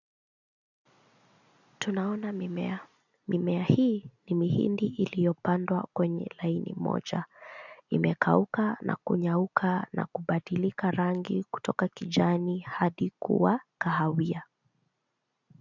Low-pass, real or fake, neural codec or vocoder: 7.2 kHz; real; none